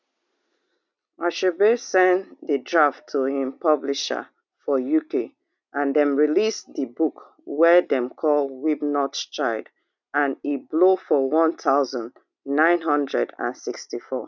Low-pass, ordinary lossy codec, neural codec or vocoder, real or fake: 7.2 kHz; none; none; real